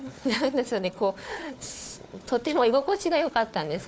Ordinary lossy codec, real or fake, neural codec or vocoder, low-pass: none; fake; codec, 16 kHz, 4 kbps, FunCodec, trained on Chinese and English, 50 frames a second; none